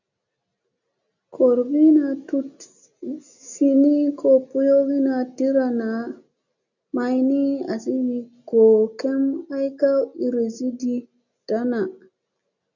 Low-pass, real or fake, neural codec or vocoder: 7.2 kHz; real; none